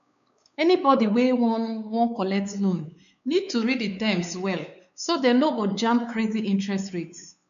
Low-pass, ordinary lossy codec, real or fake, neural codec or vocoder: 7.2 kHz; MP3, 96 kbps; fake; codec, 16 kHz, 4 kbps, X-Codec, WavLM features, trained on Multilingual LibriSpeech